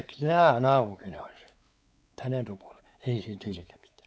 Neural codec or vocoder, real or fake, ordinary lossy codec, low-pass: codec, 16 kHz, 4 kbps, X-Codec, WavLM features, trained on Multilingual LibriSpeech; fake; none; none